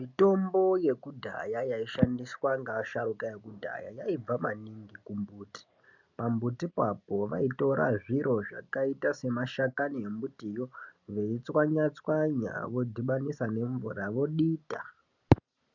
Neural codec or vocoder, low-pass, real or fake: none; 7.2 kHz; real